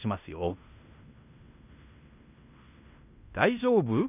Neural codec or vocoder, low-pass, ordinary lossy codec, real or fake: codec, 16 kHz in and 24 kHz out, 0.9 kbps, LongCat-Audio-Codec, fine tuned four codebook decoder; 3.6 kHz; none; fake